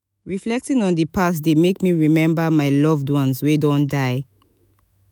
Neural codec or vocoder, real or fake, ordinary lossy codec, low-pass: autoencoder, 48 kHz, 128 numbers a frame, DAC-VAE, trained on Japanese speech; fake; none; none